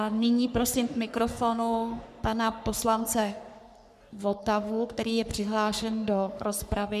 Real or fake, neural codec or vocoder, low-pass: fake; codec, 44.1 kHz, 3.4 kbps, Pupu-Codec; 14.4 kHz